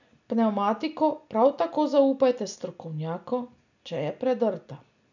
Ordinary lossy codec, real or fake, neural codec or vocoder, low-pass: none; real; none; 7.2 kHz